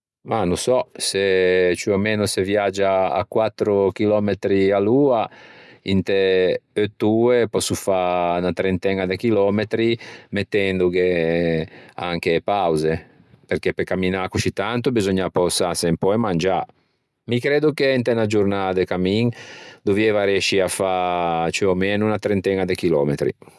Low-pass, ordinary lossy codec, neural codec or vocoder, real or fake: none; none; none; real